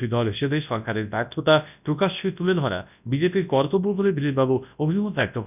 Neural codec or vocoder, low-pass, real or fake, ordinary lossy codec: codec, 24 kHz, 0.9 kbps, WavTokenizer, large speech release; 3.6 kHz; fake; none